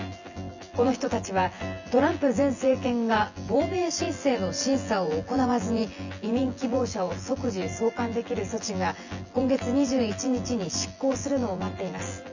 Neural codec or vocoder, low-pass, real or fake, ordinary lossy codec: vocoder, 24 kHz, 100 mel bands, Vocos; 7.2 kHz; fake; Opus, 64 kbps